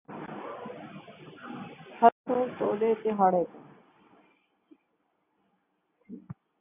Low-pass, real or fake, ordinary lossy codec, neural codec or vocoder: 3.6 kHz; real; AAC, 24 kbps; none